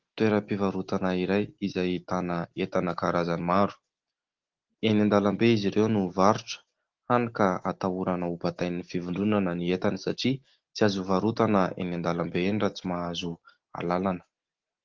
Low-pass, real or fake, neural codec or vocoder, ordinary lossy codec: 7.2 kHz; real; none; Opus, 16 kbps